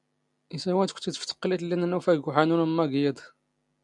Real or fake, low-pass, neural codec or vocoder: real; 10.8 kHz; none